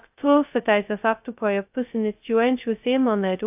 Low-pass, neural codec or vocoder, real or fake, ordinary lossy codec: 3.6 kHz; codec, 16 kHz, 0.2 kbps, FocalCodec; fake; AAC, 32 kbps